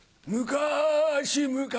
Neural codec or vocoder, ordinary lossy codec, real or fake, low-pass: none; none; real; none